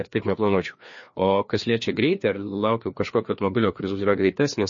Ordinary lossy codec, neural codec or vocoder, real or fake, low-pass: MP3, 32 kbps; codec, 16 kHz, 2 kbps, FreqCodec, larger model; fake; 7.2 kHz